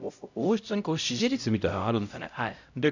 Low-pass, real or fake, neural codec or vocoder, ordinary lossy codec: 7.2 kHz; fake; codec, 16 kHz, 0.5 kbps, X-Codec, HuBERT features, trained on LibriSpeech; none